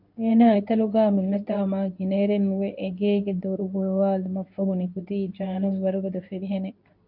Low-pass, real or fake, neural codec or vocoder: 5.4 kHz; fake; codec, 24 kHz, 0.9 kbps, WavTokenizer, medium speech release version 2